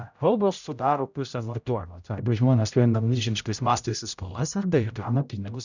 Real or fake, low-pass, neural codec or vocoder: fake; 7.2 kHz; codec, 16 kHz, 0.5 kbps, X-Codec, HuBERT features, trained on general audio